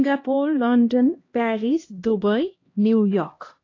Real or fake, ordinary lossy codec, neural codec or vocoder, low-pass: fake; AAC, 32 kbps; codec, 16 kHz, 1 kbps, X-Codec, HuBERT features, trained on LibriSpeech; 7.2 kHz